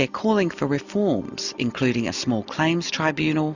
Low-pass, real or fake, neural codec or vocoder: 7.2 kHz; real; none